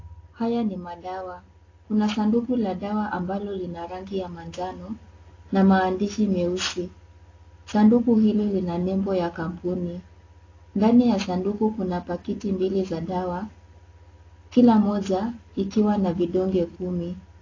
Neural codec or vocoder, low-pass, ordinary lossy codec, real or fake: none; 7.2 kHz; AAC, 32 kbps; real